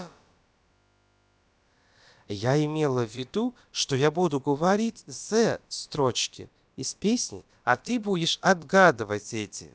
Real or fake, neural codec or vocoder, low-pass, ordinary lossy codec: fake; codec, 16 kHz, about 1 kbps, DyCAST, with the encoder's durations; none; none